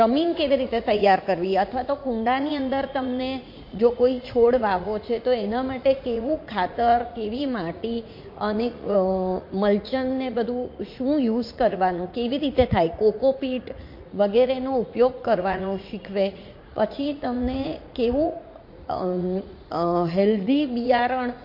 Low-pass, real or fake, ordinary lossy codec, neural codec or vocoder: 5.4 kHz; fake; MP3, 32 kbps; vocoder, 44.1 kHz, 80 mel bands, Vocos